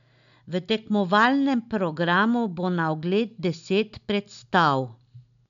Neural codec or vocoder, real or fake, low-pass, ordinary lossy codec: none; real; 7.2 kHz; none